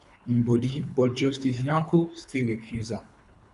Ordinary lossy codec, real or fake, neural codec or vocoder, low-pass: none; fake; codec, 24 kHz, 3 kbps, HILCodec; 10.8 kHz